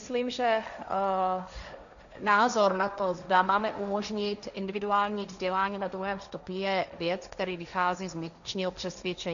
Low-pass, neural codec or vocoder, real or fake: 7.2 kHz; codec, 16 kHz, 1.1 kbps, Voila-Tokenizer; fake